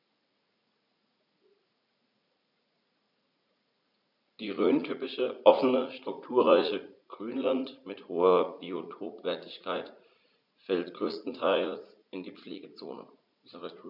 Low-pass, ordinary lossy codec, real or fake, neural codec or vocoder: 5.4 kHz; none; fake; vocoder, 44.1 kHz, 80 mel bands, Vocos